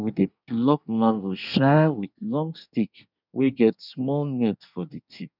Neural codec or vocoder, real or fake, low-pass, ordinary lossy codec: codec, 24 kHz, 1 kbps, SNAC; fake; 5.4 kHz; none